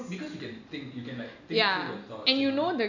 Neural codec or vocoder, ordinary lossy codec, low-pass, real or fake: none; none; 7.2 kHz; real